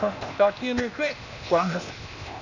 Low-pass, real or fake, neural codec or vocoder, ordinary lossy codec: 7.2 kHz; fake; codec, 16 kHz, 0.8 kbps, ZipCodec; none